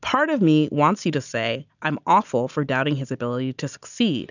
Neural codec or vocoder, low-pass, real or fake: none; 7.2 kHz; real